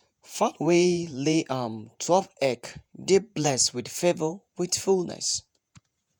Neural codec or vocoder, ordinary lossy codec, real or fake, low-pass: vocoder, 48 kHz, 128 mel bands, Vocos; none; fake; none